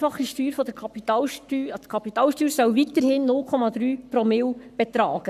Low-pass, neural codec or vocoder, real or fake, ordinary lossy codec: 14.4 kHz; codec, 44.1 kHz, 7.8 kbps, Pupu-Codec; fake; none